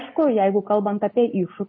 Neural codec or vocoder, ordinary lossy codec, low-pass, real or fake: none; MP3, 24 kbps; 7.2 kHz; real